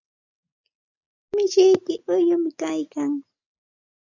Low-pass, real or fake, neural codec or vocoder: 7.2 kHz; real; none